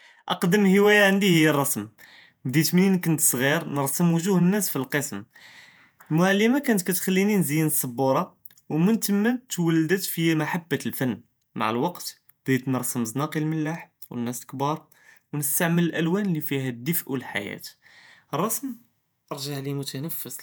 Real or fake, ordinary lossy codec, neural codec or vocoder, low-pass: fake; none; vocoder, 48 kHz, 128 mel bands, Vocos; none